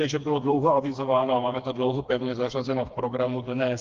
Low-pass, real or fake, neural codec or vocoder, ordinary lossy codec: 7.2 kHz; fake; codec, 16 kHz, 2 kbps, FreqCodec, smaller model; Opus, 32 kbps